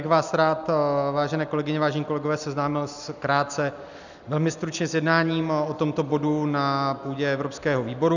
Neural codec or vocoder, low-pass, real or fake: none; 7.2 kHz; real